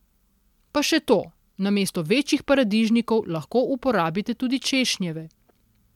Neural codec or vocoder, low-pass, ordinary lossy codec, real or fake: none; 19.8 kHz; MP3, 96 kbps; real